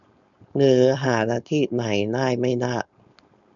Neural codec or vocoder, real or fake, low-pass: codec, 16 kHz, 4.8 kbps, FACodec; fake; 7.2 kHz